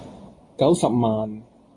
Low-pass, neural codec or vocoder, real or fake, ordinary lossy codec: 10.8 kHz; none; real; AAC, 32 kbps